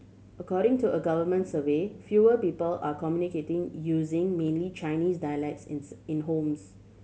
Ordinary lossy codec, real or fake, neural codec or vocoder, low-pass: none; real; none; none